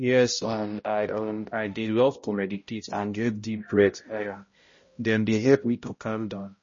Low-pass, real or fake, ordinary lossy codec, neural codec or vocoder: 7.2 kHz; fake; MP3, 32 kbps; codec, 16 kHz, 0.5 kbps, X-Codec, HuBERT features, trained on balanced general audio